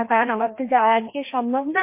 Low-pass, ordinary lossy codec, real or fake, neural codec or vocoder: 3.6 kHz; MP3, 24 kbps; fake; codec, 16 kHz, 1 kbps, FreqCodec, larger model